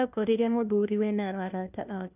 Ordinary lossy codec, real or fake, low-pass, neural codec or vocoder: none; fake; 3.6 kHz; codec, 16 kHz, 1 kbps, FunCodec, trained on LibriTTS, 50 frames a second